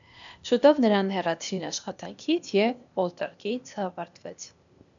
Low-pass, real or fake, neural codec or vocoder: 7.2 kHz; fake; codec, 16 kHz, 0.8 kbps, ZipCodec